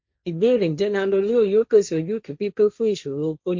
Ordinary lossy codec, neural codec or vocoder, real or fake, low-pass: MP3, 48 kbps; codec, 16 kHz, 1.1 kbps, Voila-Tokenizer; fake; 7.2 kHz